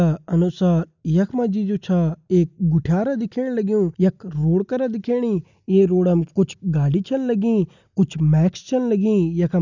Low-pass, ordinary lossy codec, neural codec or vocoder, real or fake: 7.2 kHz; none; none; real